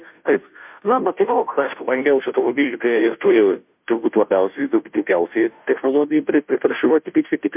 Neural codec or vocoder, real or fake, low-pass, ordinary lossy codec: codec, 16 kHz, 0.5 kbps, FunCodec, trained on Chinese and English, 25 frames a second; fake; 3.6 kHz; MP3, 32 kbps